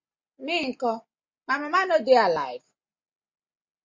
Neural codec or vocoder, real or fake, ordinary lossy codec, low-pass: none; real; MP3, 48 kbps; 7.2 kHz